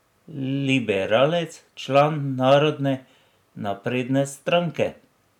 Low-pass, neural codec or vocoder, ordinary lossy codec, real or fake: 19.8 kHz; none; none; real